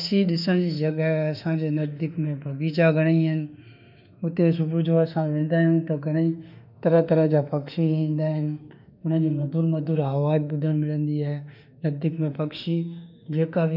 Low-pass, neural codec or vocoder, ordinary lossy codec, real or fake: 5.4 kHz; autoencoder, 48 kHz, 32 numbers a frame, DAC-VAE, trained on Japanese speech; none; fake